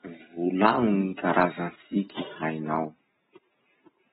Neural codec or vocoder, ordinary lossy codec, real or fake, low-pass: none; AAC, 16 kbps; real; 7.2 kHz